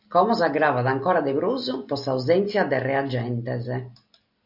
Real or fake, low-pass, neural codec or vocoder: real; 5.4 kHz; none